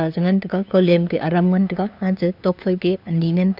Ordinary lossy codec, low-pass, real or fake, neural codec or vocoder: none; 5.4 kHz; fake; codec, 16 kHz, 2 kbps, FunCodec, trained on LibriTTS, 25 frames a second